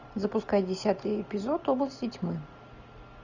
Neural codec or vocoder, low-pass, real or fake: none; 7.2 kHz; real